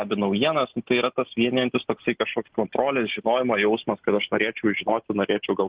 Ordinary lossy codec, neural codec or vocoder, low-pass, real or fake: Opus, 16 kbps; none; 3.6 kHz; real